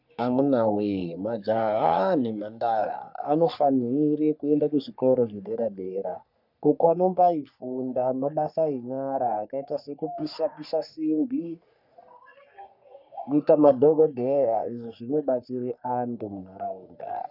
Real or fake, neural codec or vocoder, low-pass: fake; codec, 44.1 kHz, 3.4 kbps, Pupu-Codec; 5.4 kHz